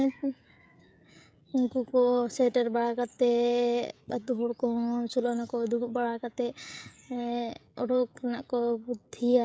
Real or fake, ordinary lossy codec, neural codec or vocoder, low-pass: fake; none; codec, 16 kHz, 16 kbps, FreqCodec, smaller model; none